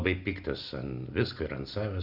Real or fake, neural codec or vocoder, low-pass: real; none; 5.4 kHz